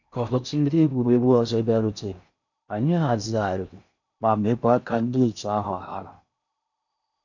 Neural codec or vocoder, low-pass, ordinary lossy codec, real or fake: codec, 16 kHz in and 24 kHz out, 0.6 kbps, FocalCodec, streaming, 4096 codes; 7.2 kHz; none; fake